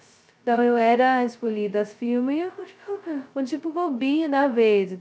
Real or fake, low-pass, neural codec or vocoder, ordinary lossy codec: fake; none; codec, 16 kHz, 0.2 kbps, FocalCodec; none